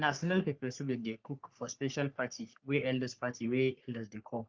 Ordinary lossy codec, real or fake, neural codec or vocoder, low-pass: Opus, 24 kbps; fake; codec, 44.1 kHz, 3.4 kbps, Pupu-Codec; 7.2 kHz